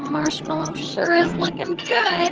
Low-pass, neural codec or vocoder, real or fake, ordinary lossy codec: 7.2 kHz; vocoder, 22.05 kHz, 80 mel bands, HiFi-GAN; fake; Opus, 16 kbps